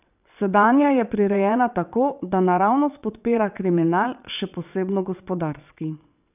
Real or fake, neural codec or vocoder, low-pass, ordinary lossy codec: fake; vocoder, 22.05 kHz, 80 mel bands, WaveNeXt; 3.6 kHz; none